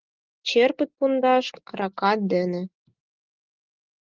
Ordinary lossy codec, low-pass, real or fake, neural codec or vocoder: Opus, 16 kbps; 7.2 kHz; real; none